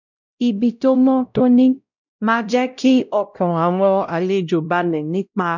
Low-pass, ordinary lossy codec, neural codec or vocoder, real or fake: 7.2 kHz; none; codec, 16 kHz, 0.5 kbps, X-Codec, WavLM features, trained on Multilingual LibriSpeech; fake